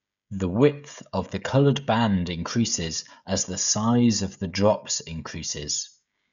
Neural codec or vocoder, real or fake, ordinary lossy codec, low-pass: codec, 16 kHz, 16 kbps, FreqCodec, smaller model; fake; none; 7.2 kHz